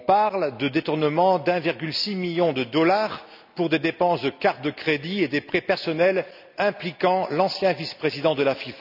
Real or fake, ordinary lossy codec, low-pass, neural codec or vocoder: real; none; 5.4 kHz; none